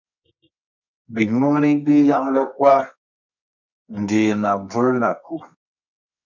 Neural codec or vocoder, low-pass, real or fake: codec, 24 kHz, 0.9 kbps, WavTokenizer, medium music audio release; 7.2 kHz; fake